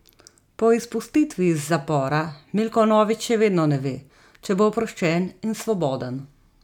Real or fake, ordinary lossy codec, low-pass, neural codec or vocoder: real; none; 19.8 kHz; none